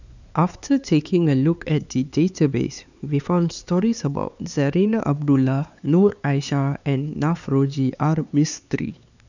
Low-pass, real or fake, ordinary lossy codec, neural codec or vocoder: 7.2 kHz; fake; none; codec, 16 kHz, 4 kbps, X-Codec, HuBERT features, trained on LibriSpeech